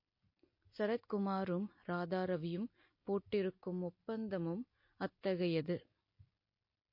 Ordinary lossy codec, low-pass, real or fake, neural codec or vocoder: MP3, 32 kbps; 5.4 kHz; real; none